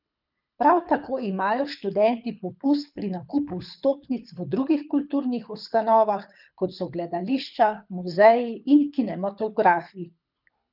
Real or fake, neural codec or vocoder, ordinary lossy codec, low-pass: fake; codec, 24 kHz, 6 kbps, HILCodec; none; 5.4 kHz